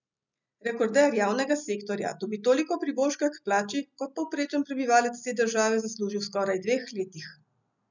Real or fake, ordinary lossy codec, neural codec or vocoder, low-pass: real; none; none; 7.2 kHz